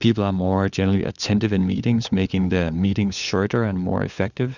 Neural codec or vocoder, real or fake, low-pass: codec, 16 kHz, 4 kbps, FunCodec, trained on LibriTTS, 50 frames a second; fake; 7.2 kHz